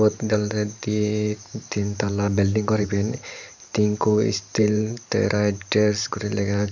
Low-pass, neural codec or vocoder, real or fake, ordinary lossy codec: 7.2 kHz; vocoder, 44.1 kHz, 128 mel bands every 512 samples, BigVGAN v2; fake; none